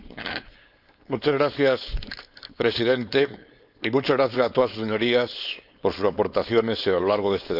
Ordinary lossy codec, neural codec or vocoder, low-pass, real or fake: none; codec, 16 kHz, 4.8 kbps, FACodec; 5.4 kHz; fake